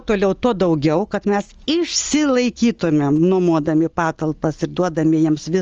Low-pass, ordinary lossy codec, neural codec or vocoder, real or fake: 7.2 kHz; Opus, 24 kbps; none; real